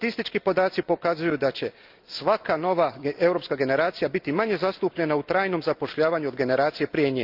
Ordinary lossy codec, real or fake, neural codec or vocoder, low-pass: Opus, 24 kbps; real; none; 5.4 kHz